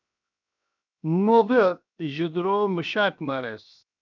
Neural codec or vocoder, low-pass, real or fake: codec, 16 kHz, 0.7 kbps, FocalCodec; 7.2 kHz; fake